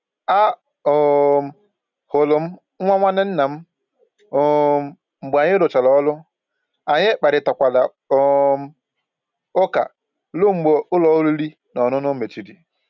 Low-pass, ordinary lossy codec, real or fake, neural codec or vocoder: 7.2 kHz; none; real; none